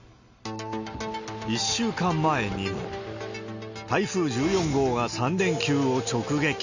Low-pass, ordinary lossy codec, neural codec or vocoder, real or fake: 7.2 kHz; Opus, 64 kbps; none; real